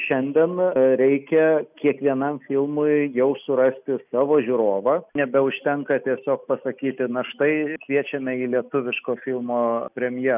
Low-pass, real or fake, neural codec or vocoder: 3.6 kHz; real; none